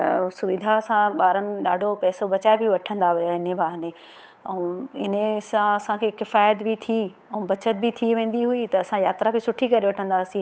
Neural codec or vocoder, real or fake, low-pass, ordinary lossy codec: codec, 16 kHz, 8 kbps, FunCodec, trained on Chinese and English, 25 frames a second; fake; none; none